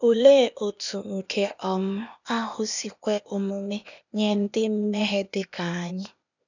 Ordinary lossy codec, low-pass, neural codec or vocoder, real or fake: none; 7.2 kHz; codec, 16 kHz, 0.8 kbps, ZipCodec; fake